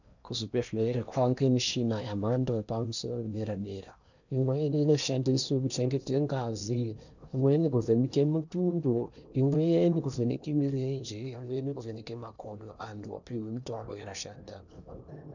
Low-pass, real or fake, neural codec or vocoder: 7.2 kHz; fake; codec, 16 kHz in and 24 kHz out, 0.8 kbps, FocalCodec, streaming, 65536 codes